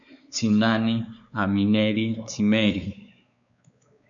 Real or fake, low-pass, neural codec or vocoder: fake; 7.2 kHz; codec, 16 kHz, 4 kbps, X-Codec, WavLM features, trained on Multilingual LibriSpeech